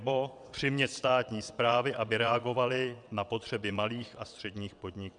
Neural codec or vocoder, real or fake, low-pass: vocoder, 22.05 kHz, 80 mel bands, WaveNeXt; fake; 9.9 kHz